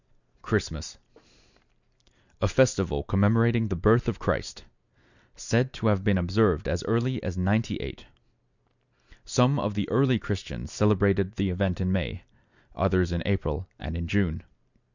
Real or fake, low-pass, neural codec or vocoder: real; 7.2 kHz; none